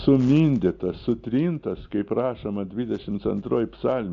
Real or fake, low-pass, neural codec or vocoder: real; 7.2 kHz; none